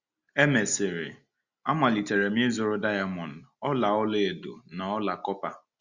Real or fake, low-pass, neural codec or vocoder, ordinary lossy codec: real; 7.2 kHz; none; Opus, 64 kbps